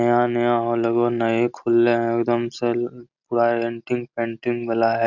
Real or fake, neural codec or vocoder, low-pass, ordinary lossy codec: real; none; 7.2 kHz; none